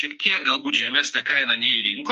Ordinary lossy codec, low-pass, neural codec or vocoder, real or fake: MP3, 48 kbps; 14.4 kHz; codec, 44.1 kHz, 2.6 kbps, SNAC; fake